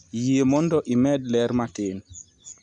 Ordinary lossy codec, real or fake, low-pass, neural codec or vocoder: none; real; 10.8 kHz; none